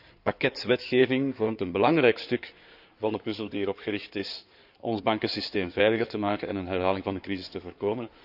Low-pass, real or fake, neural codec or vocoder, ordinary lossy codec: 5.4 kHz; fake; codec, 16 kHz in and 24 kHz out, 2.2 kbps, FireRedTTS-2 codec; none